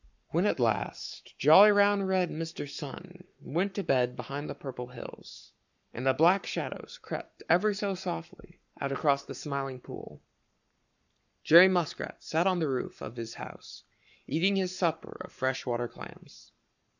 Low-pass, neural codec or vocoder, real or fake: 7.2 kHz; codec, 44.1 kHz, 7.8 kbps, Pupu-Codec; fake